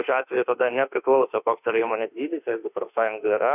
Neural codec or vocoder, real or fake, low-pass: autoencoder, 48 kHz, 32 numbers a frame, DAC-VAE, trained on Japanese speech; fake; 3.6 kHz